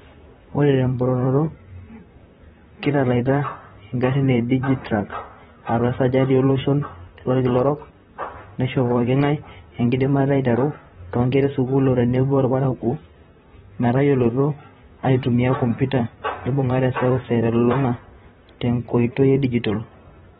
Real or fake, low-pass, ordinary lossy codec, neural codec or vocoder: fake; 19.8 kHz; AAC, 16 kbps; vocoder, 44.1 kHz, 128 mel bands, Pupu-Vocoder